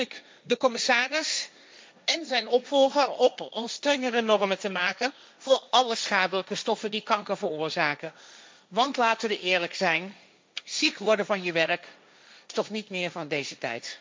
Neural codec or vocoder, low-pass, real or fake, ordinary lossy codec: codec, 16 kHz, 1.1 kbps, Voila-Tokenizer; none; fake; none